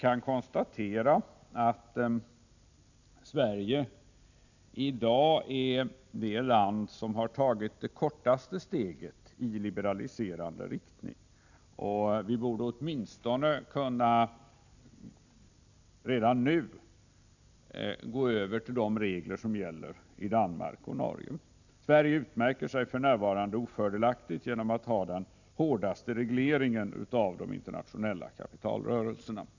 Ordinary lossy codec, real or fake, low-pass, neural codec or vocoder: none; real; 7.2 kHz; none